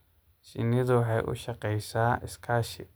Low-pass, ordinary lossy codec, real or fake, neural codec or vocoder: none; none; real; none